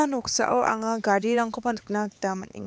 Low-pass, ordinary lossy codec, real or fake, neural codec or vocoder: none; none; fake; codec, 16 kHz, 4 kbps, X-Codec, HuBERT features, trained on LibriSpeech